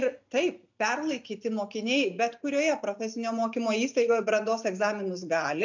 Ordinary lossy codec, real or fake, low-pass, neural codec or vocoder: MP3, 64 kbps; real; 7.2 kHz; none